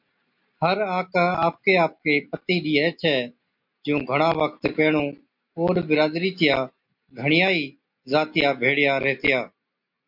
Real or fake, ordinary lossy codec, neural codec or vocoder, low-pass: real; MP3, 48 kbps; none; 5.4 kHz